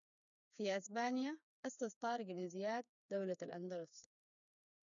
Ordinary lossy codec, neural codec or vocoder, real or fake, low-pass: none; codec, 16 kHz, 4 kbps, FreqCodec, smaller model; fake; 7.2 kHz